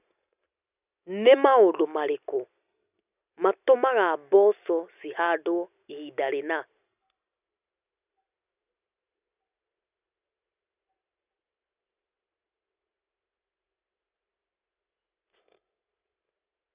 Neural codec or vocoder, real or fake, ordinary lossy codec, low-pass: none; real; none; 3.6 kHz